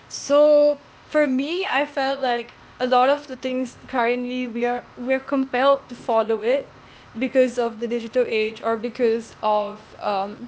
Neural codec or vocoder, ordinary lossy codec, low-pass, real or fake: codec, 16 kHz, 0.8 kbps, ZipCodec; none; none; fake